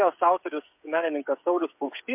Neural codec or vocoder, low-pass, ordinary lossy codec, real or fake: codec, 16 kHz, 8 kbps, FreqCodec, smaller model; 3.6 kHz; MP3, 32 kbps; fake